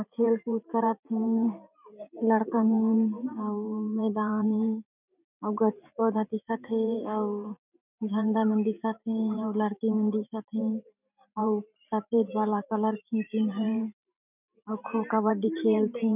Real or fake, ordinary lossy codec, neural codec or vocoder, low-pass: fake; none; vocoder, 44.1 kHz, 128 mel bands every 512 samples, BigVGAN v2; 3.6 kHz